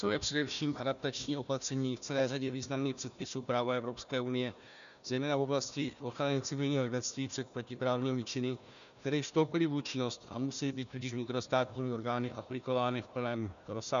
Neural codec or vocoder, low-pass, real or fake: codec, 16 kHz, 1 kbps, FunCodec, trained on Chinese and English, 50 frames a second; 7.2 kHz; fake